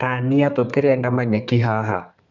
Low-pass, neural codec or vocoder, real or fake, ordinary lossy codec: 7.2 kHz; codec, 32 kHz, 1.9 kbps, SNAC; fake; none